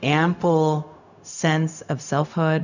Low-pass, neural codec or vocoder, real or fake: 7.2 kHz; codec, 16 kHz, 0.4 kbps, LongCat-Audio-Codec; fake